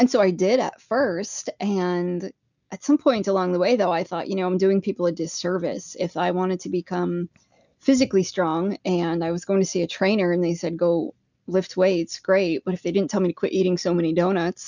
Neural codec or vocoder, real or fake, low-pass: none; real; 7.2 kHz